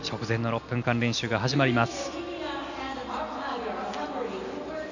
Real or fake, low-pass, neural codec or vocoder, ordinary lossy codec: real; 7.2 kHz; none; none